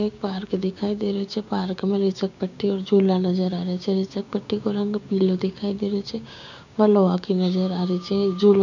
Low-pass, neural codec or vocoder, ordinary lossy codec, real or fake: 7.2 kHz; codec, 16 kHz, 6 kbps, DAC; none; fake